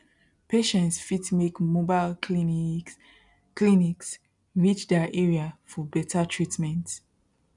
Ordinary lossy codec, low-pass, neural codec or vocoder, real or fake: none; 10.8 kHz; none; real